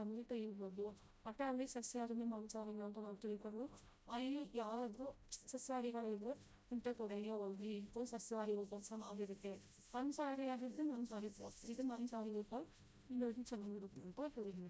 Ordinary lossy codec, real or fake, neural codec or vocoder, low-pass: none; fake; codec, 16 kHz, 0.5 kbps, FreqCodec, smaller model; none